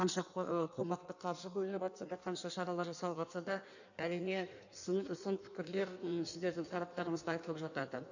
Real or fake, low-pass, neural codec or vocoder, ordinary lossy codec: fake; 7.2 kHz; codec, 16 kHz in and 24 kHz out, 1.1 kbps, FireRedTTS-2 codec; none